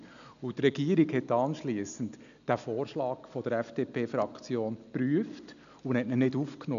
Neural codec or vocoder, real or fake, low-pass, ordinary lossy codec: none; real; 7.2 kHz; none